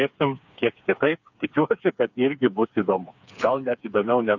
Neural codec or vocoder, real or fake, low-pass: codec, 16 kHz, 4 kbps, FreqCodec, smaller model; fake; 7.2 kHz